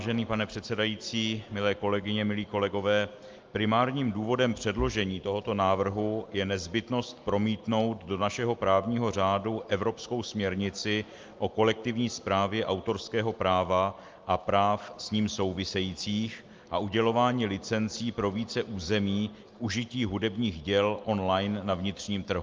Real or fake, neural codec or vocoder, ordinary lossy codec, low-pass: real; none; Opus, 32 kbps; 7.2 kHz